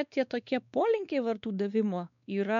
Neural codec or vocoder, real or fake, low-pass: codec, 16 kHz, 2 kbps, X-Codec, WavLM features, trained on Multilingual LibriSpeech; fake; 7.2 kHz